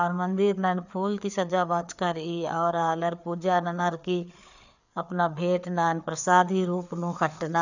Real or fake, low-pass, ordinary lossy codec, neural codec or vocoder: fake; 7.2 kHz; none; codec, 16 kHz, 4 kbps, FreqCodec, larger model